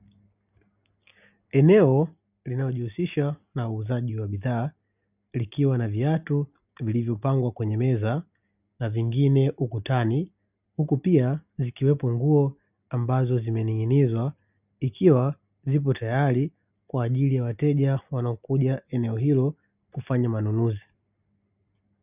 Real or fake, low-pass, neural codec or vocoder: real; 3.6 kHz; none